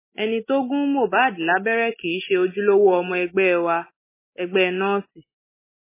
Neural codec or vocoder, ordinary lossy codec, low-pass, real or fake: none; MP3, 16 kbps; 3.6 kHz; real